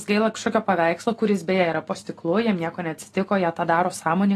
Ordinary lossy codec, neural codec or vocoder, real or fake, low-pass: AAC, 48 kbps; none; real; 14.4 kHz